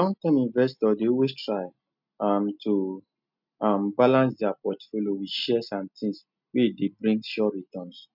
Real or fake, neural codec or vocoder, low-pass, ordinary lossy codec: real; none; 5.4 kHz; none